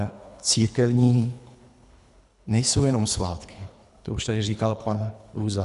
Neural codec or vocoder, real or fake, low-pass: codec, 24 kHz, 3 kbps, HILCodec; fake; 10.8 kHz